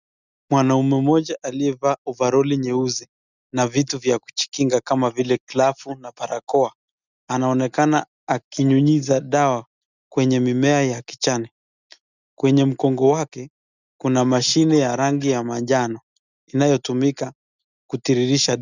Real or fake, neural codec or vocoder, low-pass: real; none; 7.2 kHz